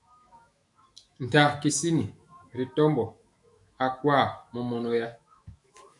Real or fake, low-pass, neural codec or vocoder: fake; 10.8 kHz; autoencoder, 48 kHz, 128 numbers a frame, DAC-VAE, trained on Japanese speech